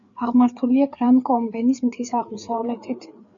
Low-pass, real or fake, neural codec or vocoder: 7.2 kHz; fake; codec, 16 kHz, 4 kbps, FreqCodec, larger model